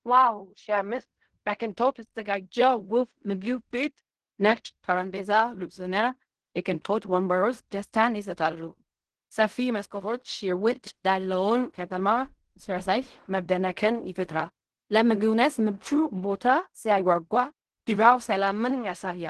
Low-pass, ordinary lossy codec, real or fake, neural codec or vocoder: 10.8 kHz; Opus, 16 kbps; fake; codec, 16 kHz in and 24 kHz out, 0.4 kbps, LongCat-Audio-Codec, fine tuned four codebook decoder